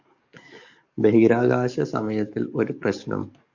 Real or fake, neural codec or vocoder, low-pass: fake; codec, 44.1 kHz, 7.8 kbps, DAC; 7.2 kHz